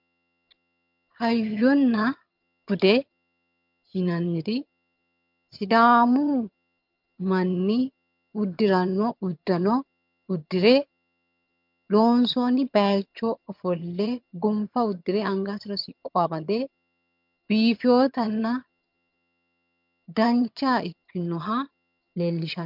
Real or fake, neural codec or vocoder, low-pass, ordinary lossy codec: fake; vocoder, 22.05 kHz, 80 mel bands, HiFi-GAN; 5.4 kHz; MP3, 48 kbps